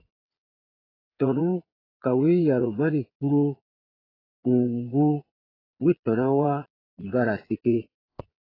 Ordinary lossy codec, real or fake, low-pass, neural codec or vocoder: AAC, 24 kbps; fake; 5.4 kHz; vocoder, 22.05 kHz, 80 mel bands, Vocos